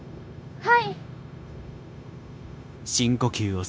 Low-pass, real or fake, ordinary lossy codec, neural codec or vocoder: none; fake; none; codec, 16 kHz, 0.9 kbps, LongCat-Audio-Codec